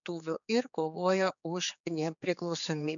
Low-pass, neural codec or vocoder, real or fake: 7.2 kHz; codec, 16 kHz, 4 kbps, X-Codec, HuBERT features, trained on general audio; fake